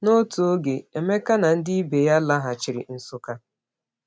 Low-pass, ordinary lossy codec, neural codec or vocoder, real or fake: none; none; none; real